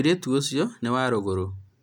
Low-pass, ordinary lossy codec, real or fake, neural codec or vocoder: 19.8 kHz; none; real; none